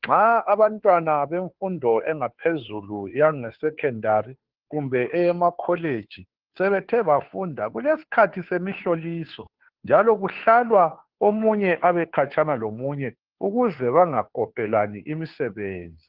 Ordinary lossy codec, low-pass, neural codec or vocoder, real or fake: Opus, 16 kbps; 5.4 kHz; codec, 16 kHz, 2 kbps, FunCodec, trained on Chinese and English, 25 frames a second; fake